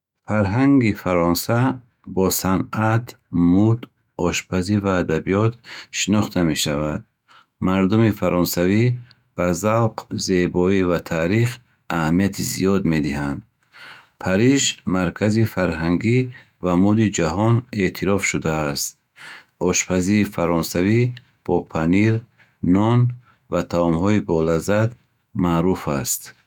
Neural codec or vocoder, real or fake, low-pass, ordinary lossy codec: autoencoder, 48 kHz, 128 numbers a frame, DAC-VAE, trained on Japanese speech; fake; 19.8 kHz; none